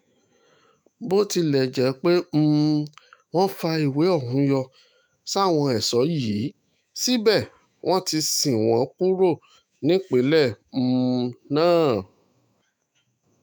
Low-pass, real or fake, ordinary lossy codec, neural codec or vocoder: none; fake; none; autoencoder, 48 kHz, 128 numbers a frame, DAC-VAE, trained on Japanese speech